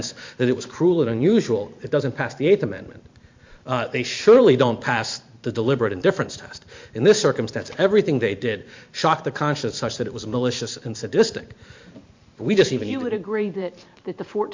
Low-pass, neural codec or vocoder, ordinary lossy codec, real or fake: 7.2 kHz; vocoder, 44.1 kHz, 128 mel bands every 512 samples, BigVGAN v2; MP3, 48 kbps; fake